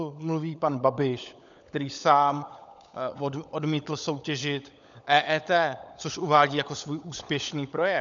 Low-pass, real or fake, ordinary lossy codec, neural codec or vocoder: 7.2 kHz; fake; MP3, 96 kbps; codec, 16 kHz, 16 kbps, FunCodec, trained on LibriTTS, 50 frames a second